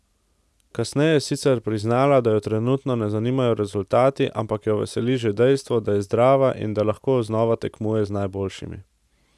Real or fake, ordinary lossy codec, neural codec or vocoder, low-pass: real; none; none; none